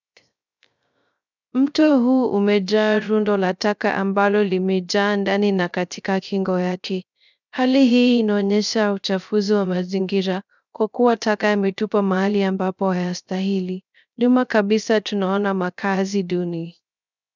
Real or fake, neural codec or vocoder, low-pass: fake; codec, 16 kHz, 0.3 kbps, FocalCodec; 7.2 kHz